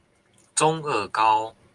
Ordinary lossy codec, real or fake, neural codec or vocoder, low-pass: Opus, 32 kbps; real; none; 10.8 kHz